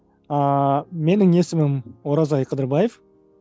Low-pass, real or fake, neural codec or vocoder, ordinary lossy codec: none; real; none; none